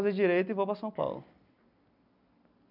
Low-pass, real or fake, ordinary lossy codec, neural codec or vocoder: 5.4 kHz; real; none; none